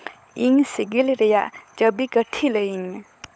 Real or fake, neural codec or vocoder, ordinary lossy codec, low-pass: fake; codec, 16 kHz, 16 kbps, FunCodec, trained on LibriTTS, 50 frames a second; none; none